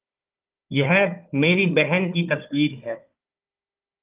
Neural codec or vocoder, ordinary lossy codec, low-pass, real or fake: codec, 16 kHz, 4 kbps, FunCodec, trained on Chinese and English, 50 frames a second; Opus, 24 kbps; 3.6 kHz; fake